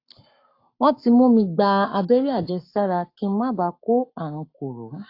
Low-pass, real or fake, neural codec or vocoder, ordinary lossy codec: 5.4 kHz; fake; codec, 44.1 kHz, 7.8 kbps, Pupu-Codec; AAC, 32 kbps